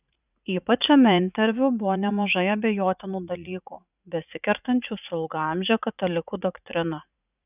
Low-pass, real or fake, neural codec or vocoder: 3.6 kHz; fake; vocoder, 44.1 kHz, 80 mel bands, Vocos